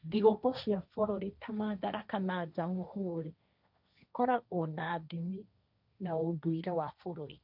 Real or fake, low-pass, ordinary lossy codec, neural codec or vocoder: fake; 5.4 kHz; none; codec, 16 kHz, 1.1 kbps, Voila-Tokenizer